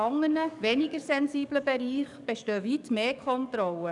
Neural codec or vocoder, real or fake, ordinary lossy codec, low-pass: codec, 44.1 kHz, 7.8 kbps, DAC; fake; none; 10.8 kHz